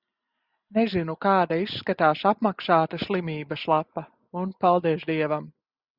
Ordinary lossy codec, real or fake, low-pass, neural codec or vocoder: Opus, 64 kbps; real; 5.4 kHz; none